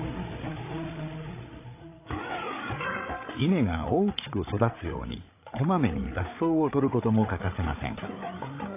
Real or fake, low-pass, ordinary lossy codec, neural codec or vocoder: fake; 3.6 kHz; AAC, 24 kbps; codec, 16 kHz, 8 kbps, FreqCodec, larger model